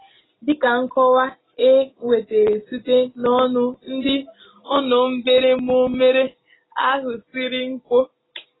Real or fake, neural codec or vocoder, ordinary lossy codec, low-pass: real; none; AAC, 16 kbps; 7.2 kHz